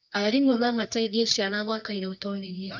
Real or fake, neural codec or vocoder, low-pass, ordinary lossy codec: fake; codec, 24 kHz, 0.9 kbps, WavTokenizer, medium music audio release; 7.2 kHz; none